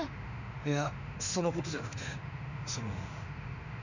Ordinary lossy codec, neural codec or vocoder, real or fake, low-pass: none; autoencoder, 48 kHz, 32 numbers a frame, DAC-VAE, trained on Japanese speech; fake; 7.2 kHz